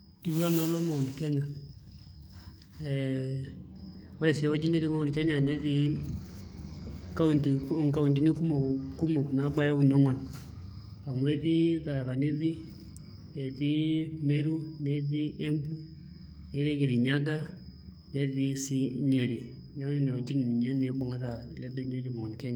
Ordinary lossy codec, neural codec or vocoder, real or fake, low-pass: none; codec, 44.1 kHz, 2.6 kbps, SNAC; fake; none